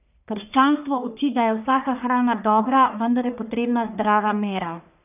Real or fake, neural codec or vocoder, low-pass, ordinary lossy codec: fake; codec, 44.1 kHz, 1.7 kbps, Pupu-Codec; 3.6 kHz; none